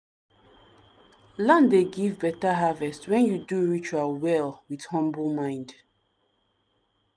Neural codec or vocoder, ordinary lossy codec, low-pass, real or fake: none; none; 9.9 kHz; real